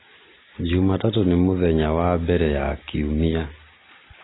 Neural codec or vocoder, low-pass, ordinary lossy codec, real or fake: none; 7.2 kHz; AAC, 16 kbps; real